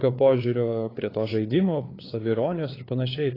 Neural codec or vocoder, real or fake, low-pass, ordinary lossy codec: codec, 16 kHz, 4 kbps, FreqCodec, larger model; fake; 5.4 kHz; AAC, 24 kbps